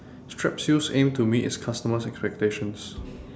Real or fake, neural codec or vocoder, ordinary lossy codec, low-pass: real; none; none; none